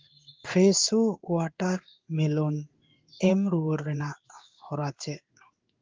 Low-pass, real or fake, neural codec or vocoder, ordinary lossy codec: 7.2 kHz; fake; codec, 16 kHz in and 24 kHz out, 1 kbps, XY-Tokenizer; Opus, 32 kbps